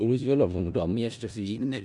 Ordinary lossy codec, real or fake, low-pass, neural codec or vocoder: none; fake; 10.8 kHz; codec, 16 kHz in and 24 kHz out, 0.4 kbps, LongCat-Audio-Codec, four codebook decoder